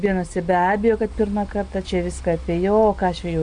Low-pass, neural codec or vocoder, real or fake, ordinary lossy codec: 9.9 kHz; none; real; MP3, 64 kbps